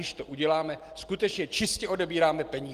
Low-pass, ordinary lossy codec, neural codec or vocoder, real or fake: 14.4 kHz; Opus, 24 kbps; none; real